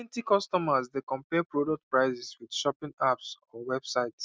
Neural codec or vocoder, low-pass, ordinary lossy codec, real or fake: none; none; none; real